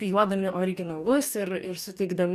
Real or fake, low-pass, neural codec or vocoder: fake; 14.4 kHz; codec, 44.1 kHz, 2.6 kbps, DAC